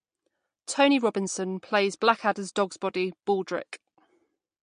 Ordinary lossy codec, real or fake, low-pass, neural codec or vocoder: MP3, 48 kbps; real; 9.9 kHz; none